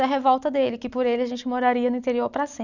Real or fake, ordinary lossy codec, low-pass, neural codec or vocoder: real; none; 7.2 kHz; none